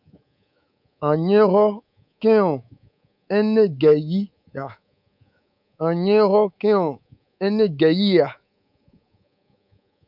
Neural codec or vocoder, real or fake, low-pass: codec, 24 kHz, 3.1 kbps, DualCodec; fake; 5.4 kHz